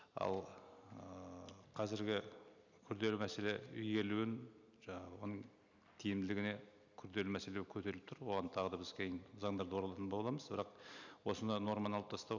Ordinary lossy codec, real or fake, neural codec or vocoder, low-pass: none; real; none; 7.2 kHz